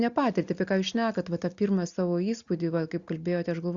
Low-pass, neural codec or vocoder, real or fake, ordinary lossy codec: 7.2 kHz; none; real; Opus, 64 kbps